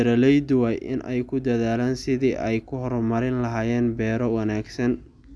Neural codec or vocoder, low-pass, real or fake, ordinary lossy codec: none; none; real; none